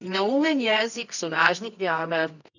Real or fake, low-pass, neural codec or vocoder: fake; 7.2 kHz; codec, 24 kHz, 0.9 kbps, WavTokenizer, medium music audio release